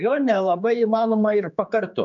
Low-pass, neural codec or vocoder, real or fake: 7.2 kHz; codec, 16 kHz, 4 kbps, X-Codec, HuBERT features, trained on general audio; fake